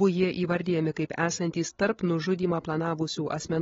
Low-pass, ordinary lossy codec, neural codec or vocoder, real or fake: 7.2 kHz; AAC, 24 kbps; codec, 16 kHz, 16 kbps, FreqCodec, larger model; fake